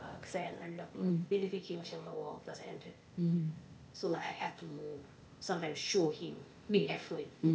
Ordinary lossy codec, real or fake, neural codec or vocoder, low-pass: none; fake; codec, 16 kHz, 0.8 kbps, ZipCodec; none